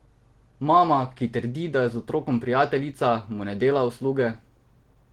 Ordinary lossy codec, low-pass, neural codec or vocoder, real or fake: Opus, 16 kbps; 19.8 kHz; none; real